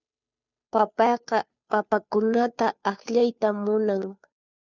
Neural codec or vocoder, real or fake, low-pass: codec, 16 kHz, 2 kbps, FunCodec, trained on Chinese and English, 25 frames a second; fake; 7.2 kHz